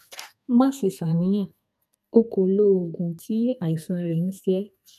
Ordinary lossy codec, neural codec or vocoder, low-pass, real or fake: none; codec, 32 kHz, 1.9 kbps, SNAC; 14.4 kHz; fake